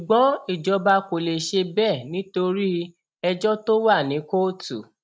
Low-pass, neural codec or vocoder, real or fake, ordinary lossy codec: none; none; real; none